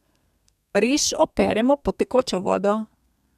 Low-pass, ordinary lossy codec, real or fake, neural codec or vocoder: 14.4 kHz; none; fake; codec, 32 kHz, 1.9 kbps, SNAC